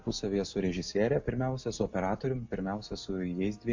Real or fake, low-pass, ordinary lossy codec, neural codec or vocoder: real; 7.2 kHz; MP3, 48 kbps; none